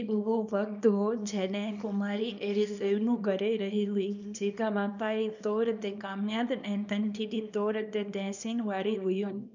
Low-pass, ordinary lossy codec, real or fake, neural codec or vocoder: 7.2 kHz; none; fake; codec, 24 kHz, 0.9 kbps, WavTokenizer, small release